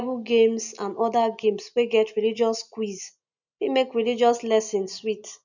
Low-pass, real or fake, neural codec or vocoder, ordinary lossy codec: 7.2 kHz; real; none; none